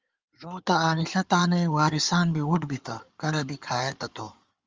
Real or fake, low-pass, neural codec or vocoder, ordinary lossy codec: fake; 7.2 kHz; codec, 16 kHz in and 24 kHz out, 2.2 kbps, FireRedTTS-2 codec; Opus, 32 kbps